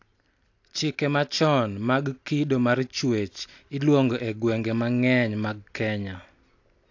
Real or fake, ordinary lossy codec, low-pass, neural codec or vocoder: real; AAC, 48 kbps; 7.2 kHz; none